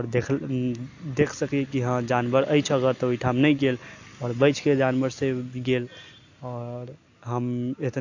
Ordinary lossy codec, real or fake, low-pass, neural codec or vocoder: AAC, 48 kbps; real; 7.2 kHz; none